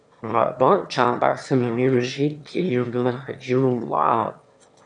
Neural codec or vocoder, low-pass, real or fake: autoencoder, 22.05 kHz, a latent of 192 numbers a frame, VITS, trained on one speaker; 9.9 kHz; fake